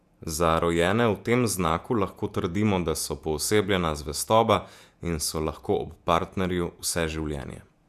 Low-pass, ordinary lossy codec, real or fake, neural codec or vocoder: 14.4 kHz; none; fake; vocoder, 48 kHz, 128 mel bands, Vocos